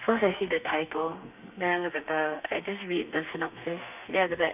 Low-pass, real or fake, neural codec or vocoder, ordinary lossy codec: 3.6 kHz; fake; codec, 32 kHz, 1.9 kbps, SNAC; none